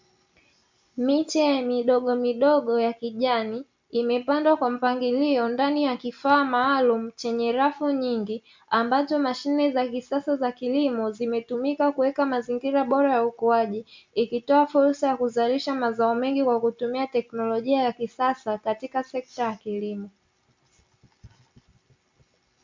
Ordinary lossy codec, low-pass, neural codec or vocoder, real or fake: MP3, 64 kbps; 7.2 kHz; none; real